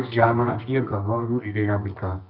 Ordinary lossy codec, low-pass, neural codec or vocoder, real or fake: Opus, 24 kbps; 5.4 kHz; codec, 24 kHz, 0.9 kbps, WavTokenizer, medium music audio release; fake